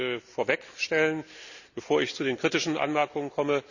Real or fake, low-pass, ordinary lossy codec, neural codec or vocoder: real; 7.2 kHz; none; none